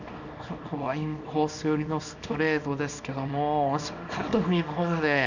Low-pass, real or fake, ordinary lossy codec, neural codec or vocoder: 7.2 kHz; fake; none; codec, 24 kHz, 0.9 kbps, WavTokenizer, small release